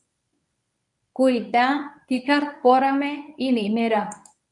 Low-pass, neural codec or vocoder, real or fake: 10.8 kHz; codec, 24 kHz, 0.9 kbps, WavTokenizer, medium speech release version 1; fake